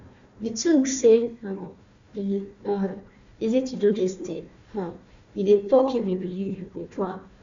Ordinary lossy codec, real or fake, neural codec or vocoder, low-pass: MP3, 64 kbps; fake; codec, 16 kHz, 1 kbps, FunCodec, trained on Chinese and English, 50 frames a second; 7.2 kHz